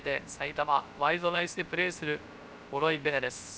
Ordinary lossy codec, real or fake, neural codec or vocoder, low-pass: none; fake; codec, 16 kHz, 0.3 kbps, FocalCodec; none